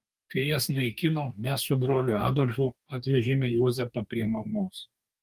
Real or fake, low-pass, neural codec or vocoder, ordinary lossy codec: fake; 14.4 kHz; codec, 44.1 kHz, 2.6 kbps, DAC; Opus, 32 kbps